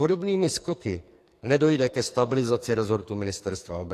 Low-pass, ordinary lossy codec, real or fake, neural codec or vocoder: 14.4 kHz; AAC, 64 kbps; fake; codec, 44.1 kHz, 2.6 kbps, SNAC